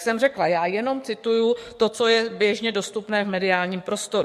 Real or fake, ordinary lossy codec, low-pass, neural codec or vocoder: fake; MP3, 64 kbps; 14.4 kHz; codec, 44.1 kHz, 7.8 kbps, DAC